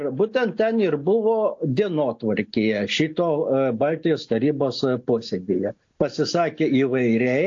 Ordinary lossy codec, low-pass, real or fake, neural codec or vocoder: AAC, 48 kbps; 7.2 kHz; real; none